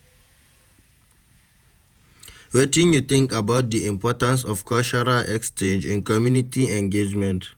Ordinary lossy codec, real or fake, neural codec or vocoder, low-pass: none; fake; vocoder, 48 kHz, 128 mel bands, Vocos; none